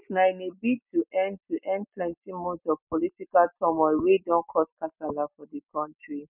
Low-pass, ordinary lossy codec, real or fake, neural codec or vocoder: 3.6 kHz; none; real; none